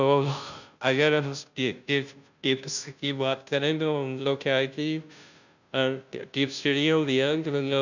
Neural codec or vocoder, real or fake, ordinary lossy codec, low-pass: codec, 16 kHz, 0.5 kbps, FunCodec, trained on Chinese and English, 25 frames a second; fake; none; 7.2 kHz